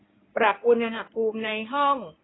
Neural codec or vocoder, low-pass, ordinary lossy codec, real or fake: codec, 16 kHz in and 24 kHz out, 2.2 kbps, FireRedTTS-2 codec; 7.2 kHz; AAC, 16 kbps; fake